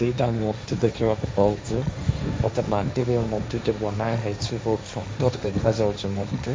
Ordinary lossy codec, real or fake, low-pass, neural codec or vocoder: none; fake; none; codec, 16 kHz, 1.1 kbps, Voila-Tokenizer